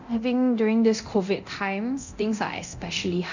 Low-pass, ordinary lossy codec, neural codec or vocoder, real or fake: 7.2 kHz; none; codec, 24 kHz, 0.9 kbps, DualCodec; fake